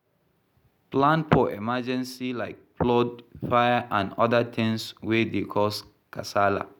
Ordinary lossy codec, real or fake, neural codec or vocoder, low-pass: none; real; none; 19.8 kHz